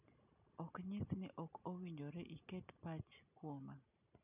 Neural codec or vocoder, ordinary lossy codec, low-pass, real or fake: none; none; 3.6 kHz; real